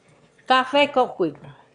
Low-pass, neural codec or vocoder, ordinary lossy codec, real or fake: 9.9 kHz; autoencoder, 22.05 kHz, a latent of 192 numbers a frame, VITS, trained on one speaker; Opus, 64 kbps; fake